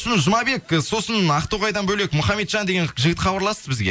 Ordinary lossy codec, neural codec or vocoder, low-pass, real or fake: none; none; none; real